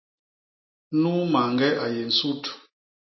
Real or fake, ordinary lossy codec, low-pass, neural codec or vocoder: real; MP3, 24 kbps; 7.2 kHz; none